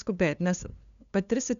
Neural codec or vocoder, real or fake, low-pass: codec, 16 kHz, 2 kbps, FunCodec, trained on LibriTTS, 25 frames a second; fake; 7.2 kHz